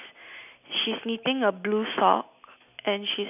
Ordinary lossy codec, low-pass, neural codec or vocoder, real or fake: none; 3.6 kHz; none; real